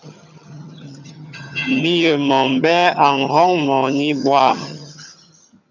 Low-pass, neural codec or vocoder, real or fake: 7.2 kHz; vocoder, 22.05 kHz, 80 mel bands, HiFi-GAN; fake